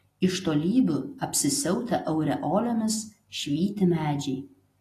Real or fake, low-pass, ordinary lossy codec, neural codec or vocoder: real; 14.4 kHz; AAC, 48 kbps; none